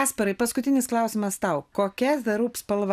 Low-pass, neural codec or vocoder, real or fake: 14.4 kHz; none; real